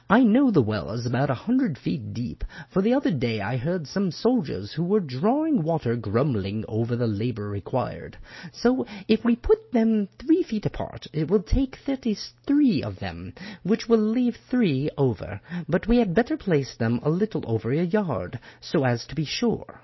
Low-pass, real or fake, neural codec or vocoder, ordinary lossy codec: 7.2 kHz; real; none; MP3, 24 kbps